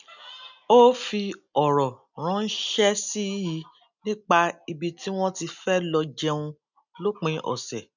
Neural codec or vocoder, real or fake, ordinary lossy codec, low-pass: none; real; none; 7.2 kHz